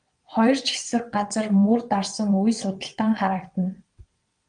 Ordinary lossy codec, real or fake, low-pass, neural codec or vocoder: Opus, 32 kbps; fake; 9.9 kHz; vocoder, 22.05 kHz, 80 mel bands, WaveNeXt